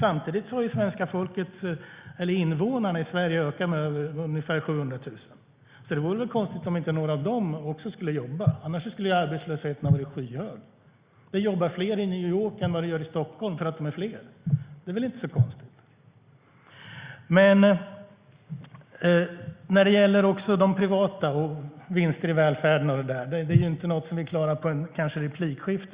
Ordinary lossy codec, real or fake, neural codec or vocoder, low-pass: Opus, 64 kbps; real; none; 3.6 kHz